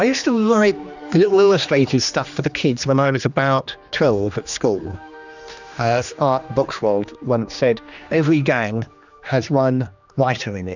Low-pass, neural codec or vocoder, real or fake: 7.2 kHz; codec, 16 kHz, 2 kbps, X-Codec, HuBERT features, trained on general audio; fake